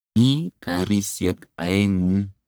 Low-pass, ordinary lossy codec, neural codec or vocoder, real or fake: none; none; codec, 44.1 kHz, 1.7 kbps, Pupu-Codec; fake